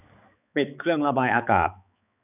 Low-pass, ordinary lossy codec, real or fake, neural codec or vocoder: 3.6 kHz; none; fake; codec, 16 kHz, 4 kbps, X-Codec, HuBERT features, trained on balanced general audio